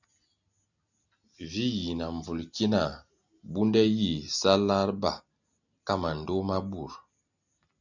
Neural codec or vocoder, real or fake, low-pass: none; real; 7.2 kHz